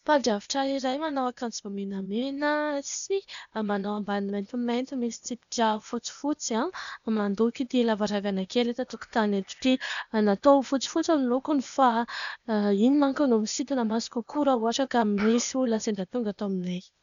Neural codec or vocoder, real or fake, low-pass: codec, 16 kHz, 0.8 kbps, ZipCodec; fake; 7.2 kHz